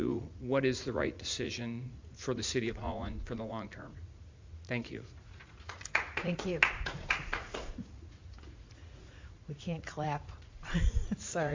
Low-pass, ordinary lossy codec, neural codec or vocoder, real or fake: 7.2 kHz; MP3, 48 kbps; vocoder, 44.1 kHz, 80 mel bands, Vocos; fake